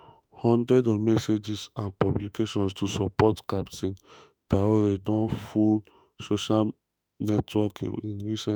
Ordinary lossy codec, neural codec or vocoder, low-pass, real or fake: none; autoencoder, 48 kHz, 32 numbers a frame, DAC-VAE, trained on Japanese speech; none; fake